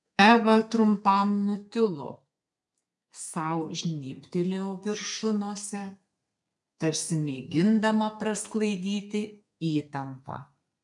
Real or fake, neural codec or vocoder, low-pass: fake; codec, 32 kHz, 1.9 kbps, SNAC; 10.8 kHz